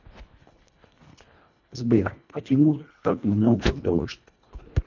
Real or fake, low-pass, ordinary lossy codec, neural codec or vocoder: fake; 7.2 kHz; none; codec, 24 kHz, 1.5 kbps, HILCodec